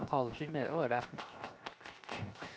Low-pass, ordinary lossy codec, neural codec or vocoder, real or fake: none; none; codec, 16 kHz, 0.7 kbps, FocalCodec; fake